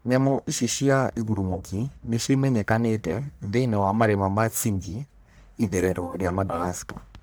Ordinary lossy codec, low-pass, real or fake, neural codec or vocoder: none; none; fake; codec, 44.1 kHz, 1.7 kbps, Pupu-Codec